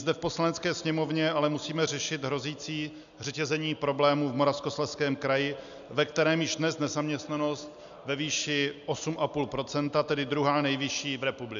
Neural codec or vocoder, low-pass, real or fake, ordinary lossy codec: none; 7.2 kHz; real; MP3, 96 kbps